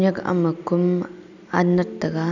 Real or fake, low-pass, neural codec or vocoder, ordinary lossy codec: real; 7.2 kHz; none; none